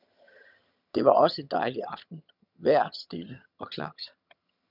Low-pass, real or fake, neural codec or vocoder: 5.4 kHz; fake; vocoder, 22.05 kHz, 80 mel bands, HiFi-GAN